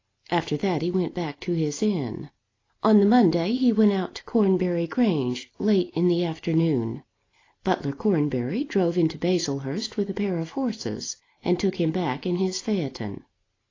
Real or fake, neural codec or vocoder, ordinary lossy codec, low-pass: real; none; AAC, 32 kbps; 7.2 kHz